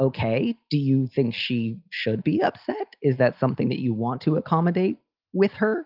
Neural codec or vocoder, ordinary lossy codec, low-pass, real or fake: none; Opus, 24 kbps; 5.4 kHz; real